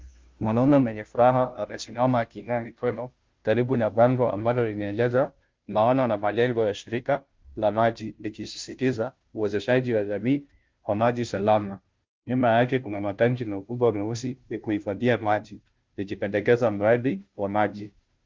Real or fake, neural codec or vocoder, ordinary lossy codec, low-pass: fake; codec, 16 kHz, 0.5 kbps, FunCodec, trained on Chinese and English, 25 frames a second; Opus, 32 kbps; 7.2 kHz